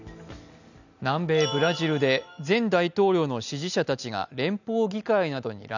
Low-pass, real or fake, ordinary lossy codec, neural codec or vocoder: 7.2 kHz; real; none; none